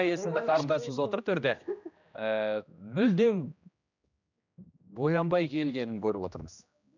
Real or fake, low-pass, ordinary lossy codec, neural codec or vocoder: fake; 7.2 kHz; none; codec, 16 kHz, 1 kbps, X-Codec, HuBERT features, trained on general audio